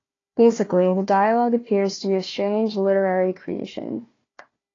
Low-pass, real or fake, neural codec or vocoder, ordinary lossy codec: 7.2 kHz; fake; codec, 16 kHz, 1 kbps, FunCodec, trained on Chinese and English, 50 frames a second; AAC, 32 kbps